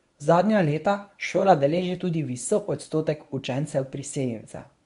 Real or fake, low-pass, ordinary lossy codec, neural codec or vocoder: fake; 10.8 kHz; none; codec, 24 kHz, 0.9 kbps, WavTokenizer, medium speech release version 1